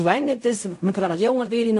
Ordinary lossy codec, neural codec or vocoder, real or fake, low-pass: AAC, 64 kbps; codec, 16 kHz in and 24 kHz out, 0.4 kbps, LongCat-Audio-Codec, fine tuned four codebook decoder; fake; 10.8 kHz